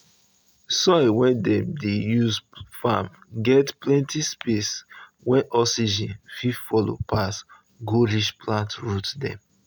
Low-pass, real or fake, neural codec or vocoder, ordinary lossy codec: none; real; none; none